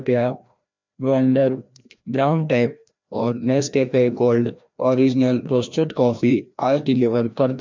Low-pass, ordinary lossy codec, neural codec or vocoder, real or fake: 7.2 kHz; MP3, 64 kbps; codec, 16 kHz, 1 kbps, FreqCodec, larger model; fake